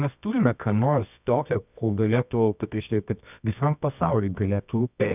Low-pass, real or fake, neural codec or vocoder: 3.6 kHz; fake; codec, 24 kHz, 0.9 kbps, WavTokenizer, medium music audio release